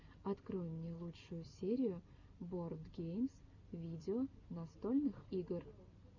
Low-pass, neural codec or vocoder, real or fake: 7.2 kHz; none; real